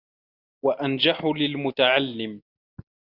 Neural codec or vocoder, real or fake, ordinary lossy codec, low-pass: none; real; Opus, 64 kbps; 5.4 kHz